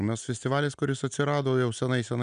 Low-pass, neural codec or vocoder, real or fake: 9.9 kHz; none; real